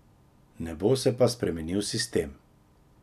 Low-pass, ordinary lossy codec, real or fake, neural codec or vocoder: 14.4 kHz; none; real; none